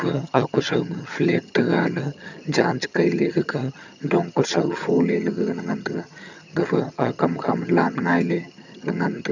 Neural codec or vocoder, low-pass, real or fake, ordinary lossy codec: vocoder, 22.05 kHz, 80 mel bands, HiFi-GAN; 7.2 kHz; fake; none